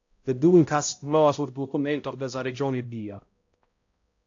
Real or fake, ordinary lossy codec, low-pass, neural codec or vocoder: fake; AAC, 48 kbps; 7.2 kHz; codec, 16 kHz, 0.5 kbps, X-Codec, HuBERT features, trained on balanced general audio